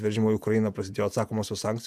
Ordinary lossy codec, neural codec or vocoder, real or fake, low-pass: MP3, 96 kbps; vocoder, 48 kHz, 128 mel bands, Vocos; fake; 14.4 kHz